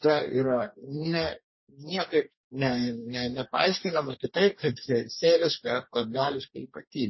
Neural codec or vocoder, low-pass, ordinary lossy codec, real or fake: codec, 44.1 kHz, 2.6 kbps, DAC; 7.2 kHz; MP3, 24 kbps; fake